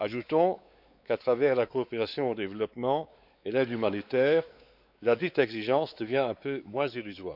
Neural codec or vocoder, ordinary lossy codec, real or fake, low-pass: codec, 16 kHz, 4 kbps, X-Codec, WavLM features, trained on Multilingual LibriSpeech; none; fake; 5.4 kHz